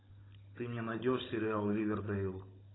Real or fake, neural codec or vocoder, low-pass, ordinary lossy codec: fake; codec, 16 kHz, 16 kbps, FunCodec, trained on Chinese and English, 50 frames a second; 7.2 kHz; AAC, 16 kbps